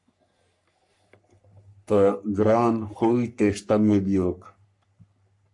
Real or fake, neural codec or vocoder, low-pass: fake; codec, 44.1 kHz, 3.4 kbps, Pupu-Codec; 10.8 kHz